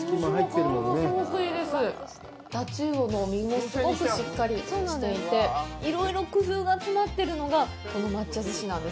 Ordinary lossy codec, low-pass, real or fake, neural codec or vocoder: none; none; real; none